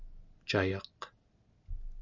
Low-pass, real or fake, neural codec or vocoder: 7.2 kHz; real; none